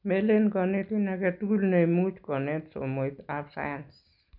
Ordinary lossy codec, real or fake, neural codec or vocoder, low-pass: none; real; none; 5.4 kHz